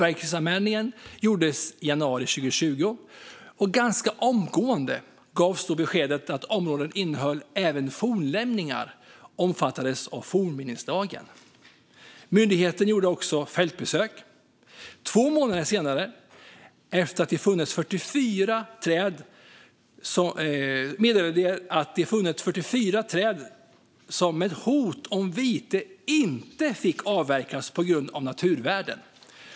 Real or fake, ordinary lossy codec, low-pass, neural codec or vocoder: real; none; none; none